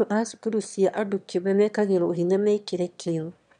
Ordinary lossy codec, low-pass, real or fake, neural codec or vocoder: none; 9.9 kHz; fake; autoencoder, 22.05 kHz, a latent of 192 numbers a frame, VITS, trained on one speaker